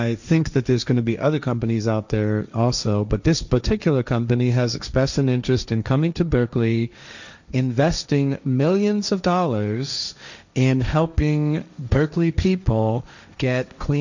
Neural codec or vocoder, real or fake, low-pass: codec, 16 kHz, 1.1 kbps, Voila-Tokenizer; fake; 7.2 kHz